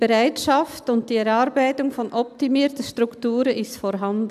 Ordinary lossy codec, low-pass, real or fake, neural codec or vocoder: none; 14.4 kHz; real; none